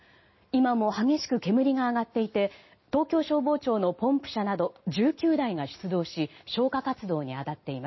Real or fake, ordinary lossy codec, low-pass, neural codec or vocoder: real; MP3, 24 kbps; 7.2 kHz; none